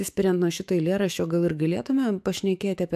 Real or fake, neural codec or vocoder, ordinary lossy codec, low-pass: fake; autoencoder, 48 kHz, 128 numbers a frame, DAC-VAE, trained on Japanese speech; AAC, 96 kbps; 14.4 kHz